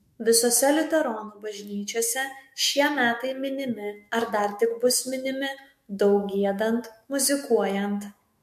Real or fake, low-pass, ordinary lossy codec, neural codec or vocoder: fake; 14.4 kHz; MP3, 64 kbps; autoencoder, 48 kHz, 128 numbers a frame, DAC-VAE, trained on Japanese speech